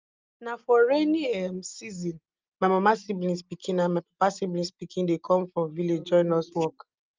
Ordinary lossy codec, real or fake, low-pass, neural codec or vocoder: Opus, 32 kbps; real; 7.2 kHz; none